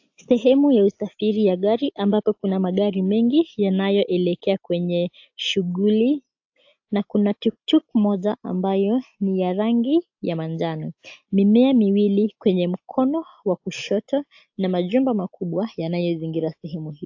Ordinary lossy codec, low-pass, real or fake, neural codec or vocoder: AAC, 48 kbps; 7.2 kHz; real; none